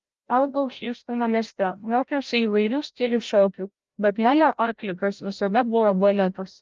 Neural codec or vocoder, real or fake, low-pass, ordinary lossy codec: codec, 16 kHz, 0.5 kbps, FreqCodec, larger model; fake; 7.2 kHz; Opus, 32 kbps